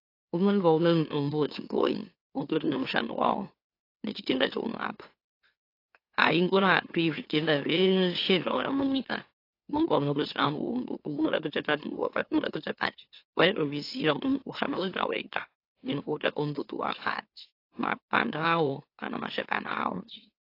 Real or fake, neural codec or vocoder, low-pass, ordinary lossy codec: fake; autoencoder, 44.1 kHz, a latent of 192 numbers a frame, MeloTTS; 5.4 kHz; AAC, 32 kbps